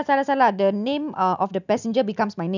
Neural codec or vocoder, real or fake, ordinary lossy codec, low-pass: none; real; none; 7.2 kHz